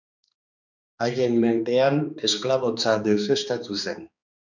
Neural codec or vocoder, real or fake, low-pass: codec, 16 kHz, 2 kbps, X-Codec, HuBERT features, trained on balanced general audio; fake; 7.2 kHz